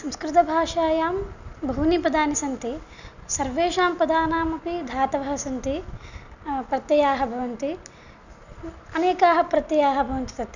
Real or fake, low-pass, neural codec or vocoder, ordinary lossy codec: real; 7.2 kHz; none; none